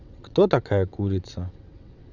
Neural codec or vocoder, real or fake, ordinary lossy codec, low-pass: vocoder, 22.05 kHz, 80 mel bands, WaveNeXt; fake; none; 7.2 kHz